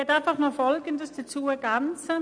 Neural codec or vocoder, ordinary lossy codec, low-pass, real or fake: none; none; 9.9 kHz; real